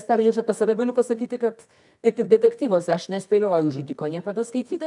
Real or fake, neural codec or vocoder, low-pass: fake; codec, 24 kHz, 0.9 kbps, WavTokenizer, medium music audio release; 10.8 kHz